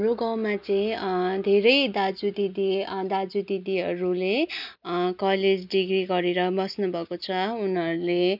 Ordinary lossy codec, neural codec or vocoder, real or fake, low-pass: none; none; real; 5.4 kHz